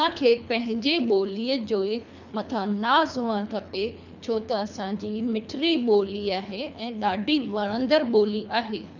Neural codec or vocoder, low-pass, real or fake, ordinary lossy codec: codec, 24 kHz, 3 kbps, HILCodec; 7.2 kHz; fake; none